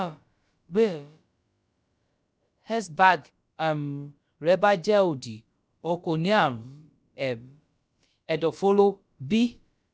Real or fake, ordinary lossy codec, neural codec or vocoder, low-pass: fake; none; codec, 16 kHz, about 1 kbps, DyCAST, with the encoder's durations; none